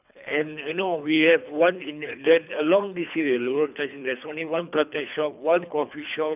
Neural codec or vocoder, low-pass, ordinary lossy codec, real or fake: codec, 24 kHz, 3 kbps, HILCodec; 3.6 kHz; none; fake